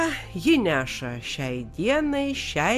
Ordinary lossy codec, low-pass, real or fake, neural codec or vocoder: MP3, 96 kbps; 14.4 kHz; real; none